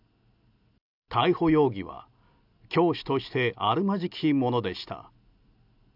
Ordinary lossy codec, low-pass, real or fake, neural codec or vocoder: none; 5.4 kHz; real; none